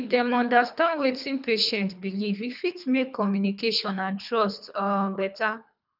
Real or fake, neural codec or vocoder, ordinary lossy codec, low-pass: fake; codec, 24 kHz, 3 kbps, HILCodec; none; 5.4 kHz